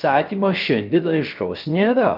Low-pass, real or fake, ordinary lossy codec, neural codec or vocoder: 5.4 kHz; fake; Opus, 24 kbps; codec, 16 kHz, 0.3 kbps, FocalCodec